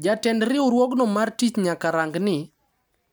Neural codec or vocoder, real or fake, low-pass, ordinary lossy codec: none; real; none; none